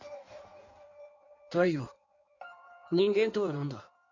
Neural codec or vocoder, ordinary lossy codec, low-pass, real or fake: codec, 16 kHz in and 24 kHz out, 1.1 kbps, FireRedTTS-2 codec; none; 7.2 kHz; fake